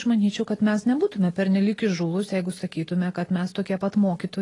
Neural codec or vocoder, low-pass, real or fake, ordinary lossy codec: none; 10.8 kHz; real; AAC, 32 kbps